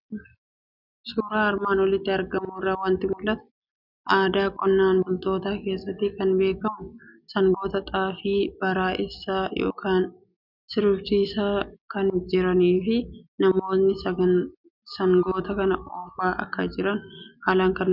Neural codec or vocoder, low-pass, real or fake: none; 5.4 kHz; real